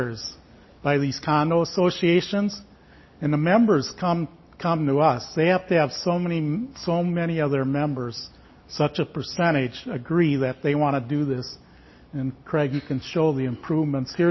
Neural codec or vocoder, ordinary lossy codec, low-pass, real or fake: none; MP3, 24 kbps; 7.2 kHz; real